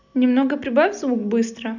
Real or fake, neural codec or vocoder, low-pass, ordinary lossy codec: real; none; 7.2 kHz; none